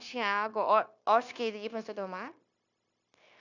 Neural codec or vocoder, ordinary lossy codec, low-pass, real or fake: codec, 16 kHz, 0.9 kbps, LongCat-Audio-Codec; none; 7.2 kHz; fake